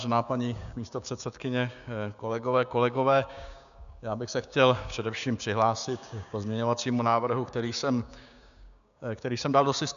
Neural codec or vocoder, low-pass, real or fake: codec, 16 kHz, 6 kbps, DAC; 7.2 kHz; fake